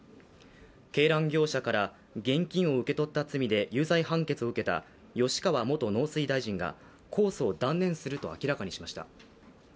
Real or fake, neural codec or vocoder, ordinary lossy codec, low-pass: real; none; none; none